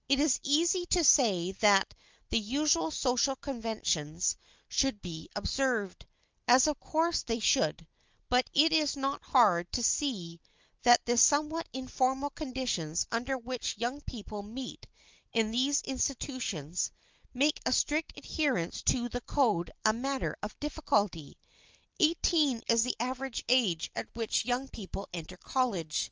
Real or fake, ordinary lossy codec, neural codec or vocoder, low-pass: real; Opus, 24 kbps; none; 7.2 kHz